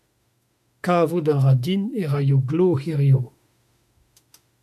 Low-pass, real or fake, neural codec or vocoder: 14.4 kHz; fake; autoencoder, 48 kHz, 32 numbers a frame, DAC-VAE, trained on Japanese speech